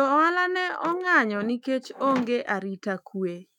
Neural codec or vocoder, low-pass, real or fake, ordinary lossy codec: autoencoder, 48 kHz, 128 numbers a frame, DAC-VAE, trained on Japanese speech; 19.8 kHz; fake; none